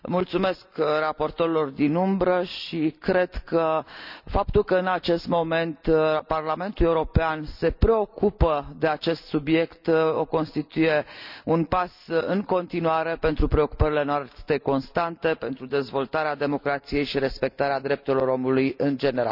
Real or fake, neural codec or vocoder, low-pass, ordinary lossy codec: real; none; 5.4 kHz; none